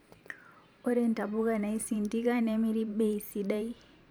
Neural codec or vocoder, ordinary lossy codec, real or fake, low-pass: vocoder, 44.1 kHz, 128 mel bands every 512 samples, BigVGAN v2; none; fake; none